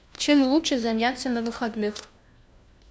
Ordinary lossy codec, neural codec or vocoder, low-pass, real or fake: none; codec, 16 kHz, 1 kbps, FunCodec, trained on LibriTTS, 50 frames a second; none; fake